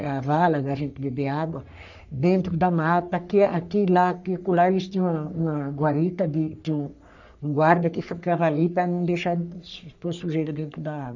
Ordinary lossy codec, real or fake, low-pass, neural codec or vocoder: none; fake; 7.2 kHz; codec, 44.1 kHz, 3.4 kbps, Pupu-Codec